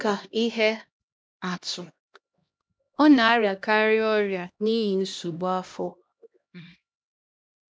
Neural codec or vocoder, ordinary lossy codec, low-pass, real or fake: codec, 16 kHz, 1 kbps, X-Codec, HuBERT features, trained on LibriSpeech; none; none; fake